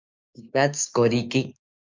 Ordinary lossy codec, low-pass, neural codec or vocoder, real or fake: MP3, 64 kbps; 7.2 kHz; codec, 16 kHz, 6 kbps, DAC; fake